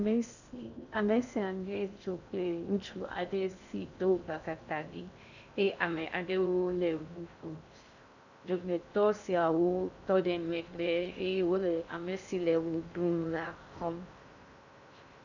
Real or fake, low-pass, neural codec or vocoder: fake; 7.2 kHz; codec, 16 kHz in and 24 kHz out, 0.6 kbps, FocalCodec, streaming, 2048 codes